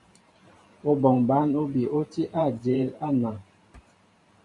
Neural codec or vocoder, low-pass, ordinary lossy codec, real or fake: vocoder, 44.1 kHz, 128 mel bands every 512 samples, BigVGAN v2; 10.8 kHz; MP3, 64 kbps; fake